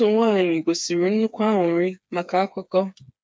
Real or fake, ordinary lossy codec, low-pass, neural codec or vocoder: fake; none; none; codec, 16 kHz, 4 kbps, FreqCodec, smaller model